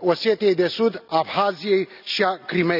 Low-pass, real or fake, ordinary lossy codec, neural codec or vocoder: 5.4 kHz; real; none; none